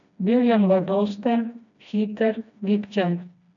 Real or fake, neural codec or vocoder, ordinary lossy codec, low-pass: fake; codec, 16 kHz, 1 kbps, FreqCodec, smaller model; none; 7.2 kHz